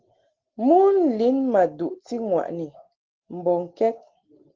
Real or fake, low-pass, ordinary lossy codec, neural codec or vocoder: real; 7.2 kHz; Opus, 16 kbps; none